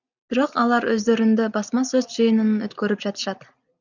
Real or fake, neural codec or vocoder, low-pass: real; none; 7.2 kHz